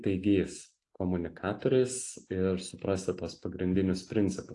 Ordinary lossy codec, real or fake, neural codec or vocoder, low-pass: AAC, 48 kbps; real; none; 10.8 kHz